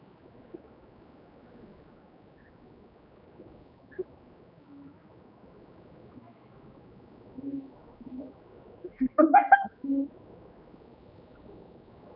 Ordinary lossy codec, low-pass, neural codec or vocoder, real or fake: none; 5.4 kHz; codec, 16 kHz, 2 kbps, X-Codec, HuBERT features, trained on general audio; fake